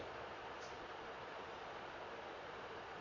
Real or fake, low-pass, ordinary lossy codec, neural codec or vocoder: fake; 7.2 kHz; none; vocoder, 22.05 kHz, 80 mel bands, Vocos